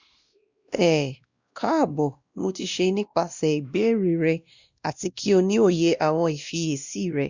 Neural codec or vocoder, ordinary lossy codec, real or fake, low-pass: codec, 16 kHz, 1 kbps, X-Codec, WavLM features, trained on Multilingual LibriSpeech; Opus, 64 kbps; fake; 7.2 kHz